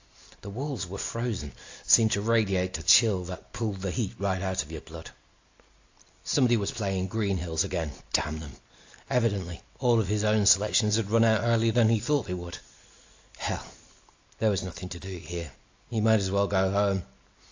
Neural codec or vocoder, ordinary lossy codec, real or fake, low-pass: none; AAC, 48 kbps; real; 7.2 kHz